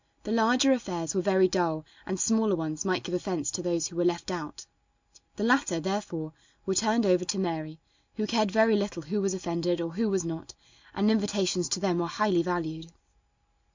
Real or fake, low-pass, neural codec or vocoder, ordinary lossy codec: real; 7.2 kHz; none; MP3, 64 kbps